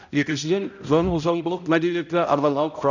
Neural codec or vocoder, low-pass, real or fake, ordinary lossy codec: codec, 16 kHz, 0.5 kbps, X-Codec, HuBERT features, trained on balanced general audio; 7.2 kHz; fake; none